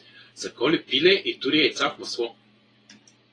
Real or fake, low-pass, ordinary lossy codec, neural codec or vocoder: real; 9.9 kHz; AAC, 32 kbps; none